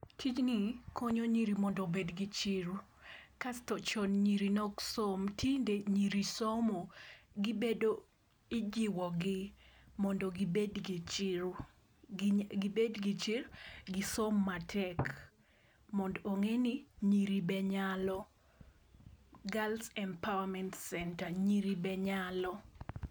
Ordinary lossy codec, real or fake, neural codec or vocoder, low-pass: none; real; none; none